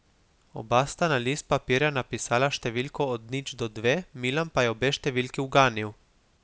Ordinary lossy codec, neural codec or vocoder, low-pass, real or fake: none; none; none; real